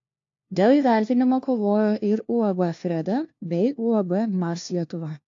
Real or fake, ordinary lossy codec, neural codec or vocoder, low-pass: fake; AAC, 48 kbps; codec, 16 kHz, 1 kbps, FunCodec, trained on LibriTTS, 50 frames a second; 7.2 kHz